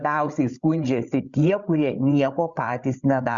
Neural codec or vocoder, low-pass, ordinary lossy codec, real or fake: codec, 16 kHz, 8 kbps, FreqCodec, larger model; 7.2 kHz; Opus, 64 kbps; fake